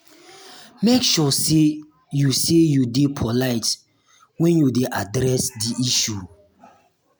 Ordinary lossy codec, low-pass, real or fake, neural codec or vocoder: none; none; real; none